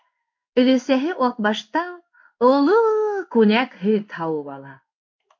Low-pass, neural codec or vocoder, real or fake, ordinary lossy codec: 7.2 kHz; codec, 16 kHz in and 24 kHz out, 1 kbps, XY-Tokenizer; fake; MP3, 48 kbps